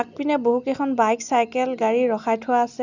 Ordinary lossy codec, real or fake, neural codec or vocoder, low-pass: none; real; none; 7.2 kHz